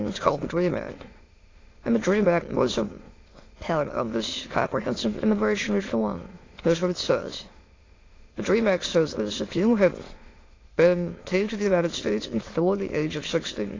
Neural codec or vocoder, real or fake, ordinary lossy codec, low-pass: autoencoder, 22.05 kHz, a latent of 192 numbers a frame, VITS, trained on many speakers; fake; AAC, 32 kbps; 7.2 kHz